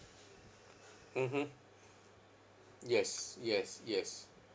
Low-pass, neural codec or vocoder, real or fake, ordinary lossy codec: none; none; real; none